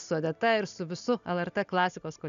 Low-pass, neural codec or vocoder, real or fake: 7.2 kHz; none; real